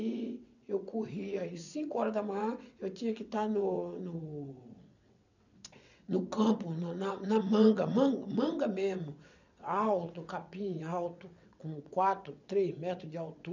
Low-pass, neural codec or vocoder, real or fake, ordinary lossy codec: 7.2 kHz; vocoder, 22.05 kHz, 80 mel bands, WaveNeXt; fake; none